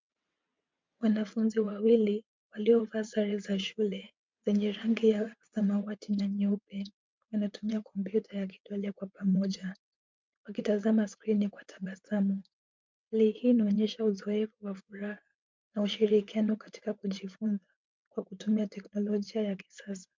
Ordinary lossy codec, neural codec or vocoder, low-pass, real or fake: MP3, 64 kbps; vocoder, 22.05 kHz, 80 mel bands, WaveNeXt; 7.2 kHz; fake